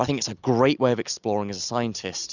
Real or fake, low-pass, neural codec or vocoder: real; 7.2 kHz; none